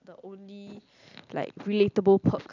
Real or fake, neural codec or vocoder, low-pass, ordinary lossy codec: real; none; 7.2 kHz; none